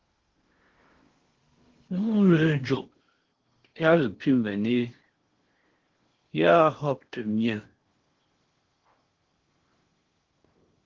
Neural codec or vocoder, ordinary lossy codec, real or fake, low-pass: codec, 16 kHz in and 24 kHz out, 0.8 kbps, FocalCodec, streaming, 65536 codes; Opus, 16 kbps; fake; 7.2 kHz